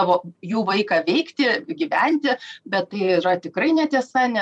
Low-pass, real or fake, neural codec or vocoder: 10.8 kHz; fake; vocoder, 44.1 kHz, 128 mel bands every 256 samples, BigVGAN v2